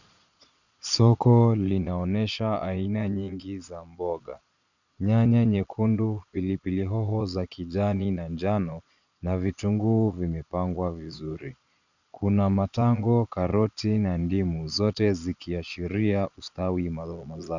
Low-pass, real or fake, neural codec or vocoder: 7.2 kHz; fake; vocoder, 22.05 kHz, 80 mel bands, Vocos